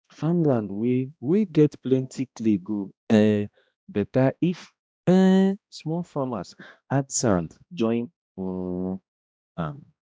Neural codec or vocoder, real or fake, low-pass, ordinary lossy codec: codec, 16 kHz, 1 kbps, X-Codec, HuBERT features, trained on balanced general audio; fake; none; none